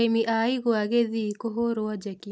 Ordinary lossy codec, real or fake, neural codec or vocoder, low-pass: none; real; none; none